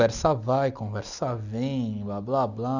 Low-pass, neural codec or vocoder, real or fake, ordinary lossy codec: 7.2 kHz; none; real; none